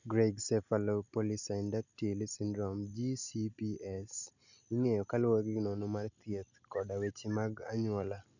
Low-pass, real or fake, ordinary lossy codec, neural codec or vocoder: 7.2 kHz; real; none; none